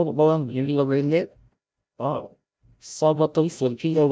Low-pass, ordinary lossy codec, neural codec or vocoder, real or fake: none; none; codec, 16 kHz, 0.5 kbps, FreqCodec, larger model; fake